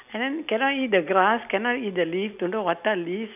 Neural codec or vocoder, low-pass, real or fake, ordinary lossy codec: none; 3.6 kHz; real; none